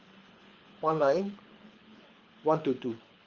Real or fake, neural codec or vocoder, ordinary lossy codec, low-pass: fake; codec, 24 kHz, 6 kbps, HILCodec; Opus, 32 kbps; 7.2 kHz